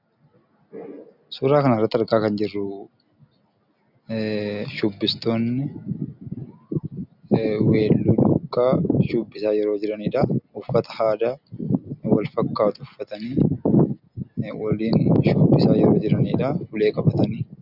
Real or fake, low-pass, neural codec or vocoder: real; 5.4 kHz; none